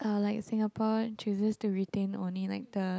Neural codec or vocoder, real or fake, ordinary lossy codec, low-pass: none; real; none; none